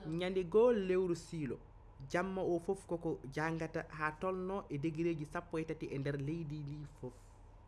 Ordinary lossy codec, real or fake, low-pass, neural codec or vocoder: none; real; none; none